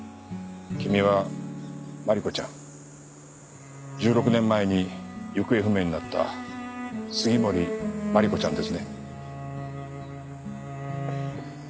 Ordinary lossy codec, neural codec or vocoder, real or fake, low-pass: none; none; real; none